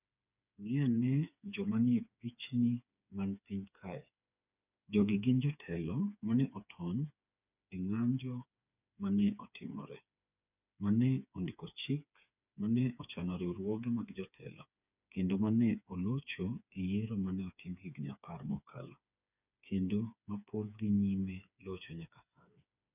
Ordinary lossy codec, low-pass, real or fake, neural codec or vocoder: none; 3.6 kHz; fake; codec, 16 kHz, 4 kbps, FreqCodec, smaller model